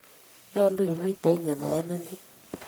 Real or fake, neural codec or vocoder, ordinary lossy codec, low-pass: fake; codec, 44.1 kHz, 1.7 kbps, Pupu-Codec; none; none